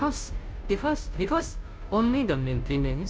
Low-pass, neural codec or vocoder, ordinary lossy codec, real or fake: none; codec, 16 kHz, 0.5 kbps, FunCodec, trained on Chinese and English, 25 frames a second; none; fake